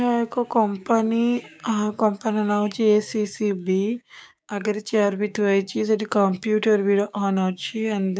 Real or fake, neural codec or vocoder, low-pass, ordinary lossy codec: fake; codec, 16 kHz, 6 kbps, DAC; none; none